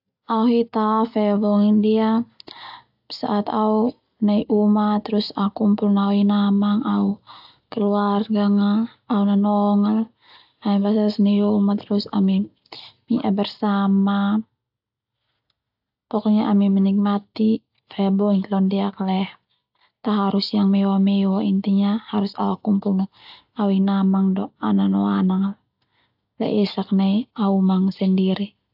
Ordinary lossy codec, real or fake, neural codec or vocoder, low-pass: AAC, 48 kbps; real; none; 5.4 kHz